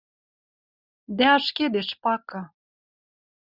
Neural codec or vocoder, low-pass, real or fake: none; 5.4 kHz; real